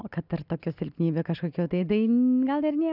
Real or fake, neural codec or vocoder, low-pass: real; none; 5.4 kHz